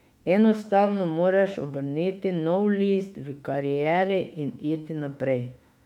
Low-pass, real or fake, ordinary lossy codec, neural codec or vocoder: 19.8 kHz; fake; MP3, 96 kbps; autoencoder, 48 kHz, 32 numbers a frame, DAC-VAE, trained on Japanese speech